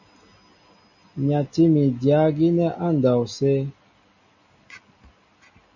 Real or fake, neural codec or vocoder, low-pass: real; none; 7.2 kHz